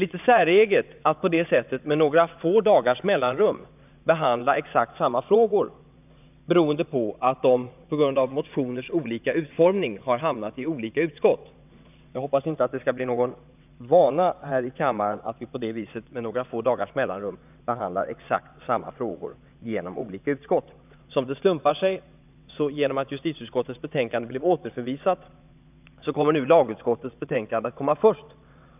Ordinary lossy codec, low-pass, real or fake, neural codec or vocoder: none; 3.6 kHz; fake; vocoder, 22.05 kHz, 80 mel bands, WaveNeXt